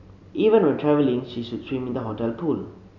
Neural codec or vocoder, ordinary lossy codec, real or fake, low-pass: none; none; real; 7.2 kHz